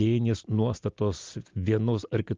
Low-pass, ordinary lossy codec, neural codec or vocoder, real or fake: 7.2 kHz; Opus, 32 kbps; none; real